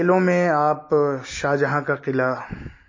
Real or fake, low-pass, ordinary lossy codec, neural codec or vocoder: real; 7.2 kHz; MP3, 32 kbps; none